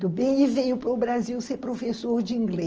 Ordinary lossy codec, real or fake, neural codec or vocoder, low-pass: Opus, 24 kbps; real; none; 7.2 kHz